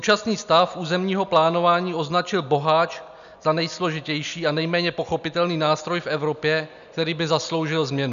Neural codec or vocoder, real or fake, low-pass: none; real; 7.2 kHz